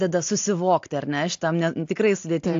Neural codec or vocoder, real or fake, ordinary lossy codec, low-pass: none; real; AAC, 48 kbps; 7.2 kHz